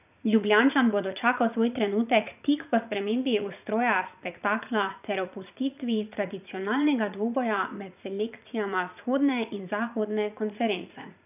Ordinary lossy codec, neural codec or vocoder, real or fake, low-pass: none; vocoder, 44.1 kHz, 80 mel bands, Vocos; fake; 3.6 kHz